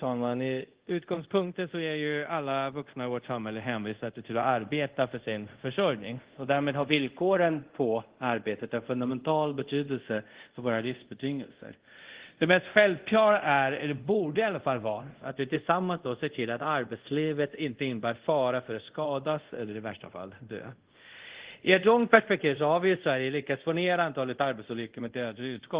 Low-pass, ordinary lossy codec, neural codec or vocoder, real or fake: 3.6 kHz; Opus, 16 kbps; codec, 24 kHz, 0.5 kbps, DualCodec; fake